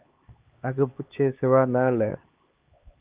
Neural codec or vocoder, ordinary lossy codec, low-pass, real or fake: codec, 16 kHz, 2 kbps, X-Codec, HuBERT features, trained on LibriSpeech; Opus, 24 kbps; 3.6 kHz; fake